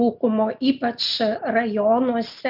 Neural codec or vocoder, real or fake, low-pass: none; real; 5.4 kHz